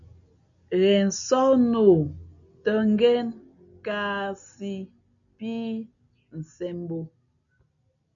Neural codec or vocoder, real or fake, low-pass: none; real; 7.2 kHz